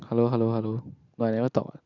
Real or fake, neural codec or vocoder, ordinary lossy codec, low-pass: real; none; none; 7.2 kHz